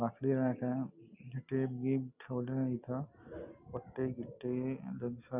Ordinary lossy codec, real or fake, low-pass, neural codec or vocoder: none; real; 3.6 kHz; none